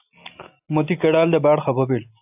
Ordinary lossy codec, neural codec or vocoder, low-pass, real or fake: AAC, 32 kbps; none; 3.6 kHz; real